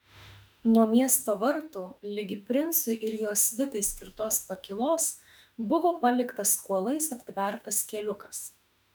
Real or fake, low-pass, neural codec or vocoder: fake; 19.8 kHz; autoencoder, 48 kHz, 32 numbers a frame, DAC-VAE, trained on Japanese speech